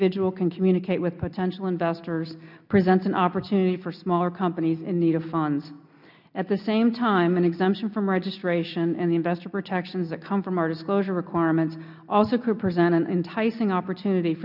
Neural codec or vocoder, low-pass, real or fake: none; 5.4 kHz; real